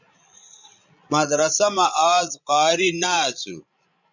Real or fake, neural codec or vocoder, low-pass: fake; codec, 16 kHz, 16 kbps, FreqCodec, larger model; 7.2 kHz